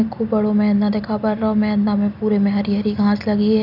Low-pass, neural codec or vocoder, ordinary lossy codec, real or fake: 5.4 kHz; none; none; real